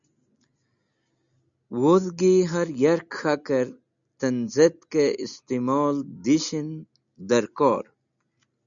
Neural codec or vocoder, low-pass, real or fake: none; 7.2 kHz; real